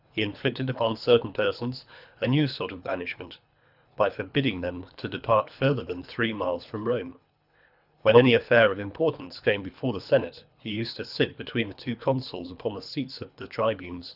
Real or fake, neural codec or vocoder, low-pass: fake; codec, 24 kHz, 3 kbps, HILCodec; 5.4 kHz